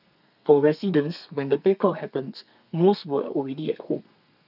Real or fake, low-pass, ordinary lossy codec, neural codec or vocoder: fake; 5.4 kHz; none; codec, 32 kHz, 1.9 kbps, SNAC